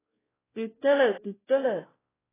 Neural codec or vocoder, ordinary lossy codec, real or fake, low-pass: codec, 16 kHz, 0.5 kbps, X-Codec, WavLM features, trained on Multilingual LibriSpeech; AAC, 16 kbps; fake; 3.6 kHz